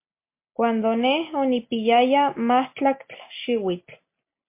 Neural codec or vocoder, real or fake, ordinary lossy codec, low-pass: none; real; MP3, 24 kbps; 3.6 kHz